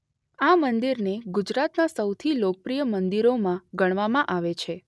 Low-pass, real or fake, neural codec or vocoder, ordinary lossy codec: none; real; none; none